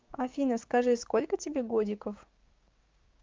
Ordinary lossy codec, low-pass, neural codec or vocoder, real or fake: Opus, 32 kbps; 7.2 kHz; codec, 16 kHz, 6 kbps, DAC; fake